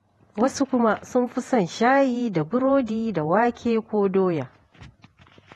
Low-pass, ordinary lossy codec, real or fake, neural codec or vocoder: 19.8 kHz; AAC, 32 kbps; fake; vocoder, 48 kHz, 128 mel bands, Vocos